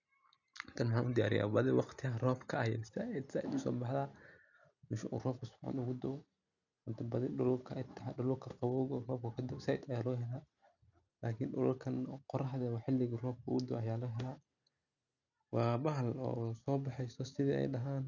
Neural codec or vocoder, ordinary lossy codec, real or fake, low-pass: none; none; real; 7.2 kHz